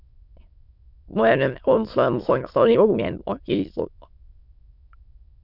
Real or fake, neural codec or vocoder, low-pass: fake; autoencoder, 22.05 kHz, a latent of 192 numbers a frame, VITS, trained on many speakers; 5.4 kHz